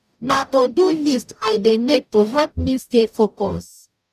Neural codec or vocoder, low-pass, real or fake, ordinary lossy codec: codec, 44.1 kHz, 0.9 kbps, DAC; 14.4 kHz; fake; none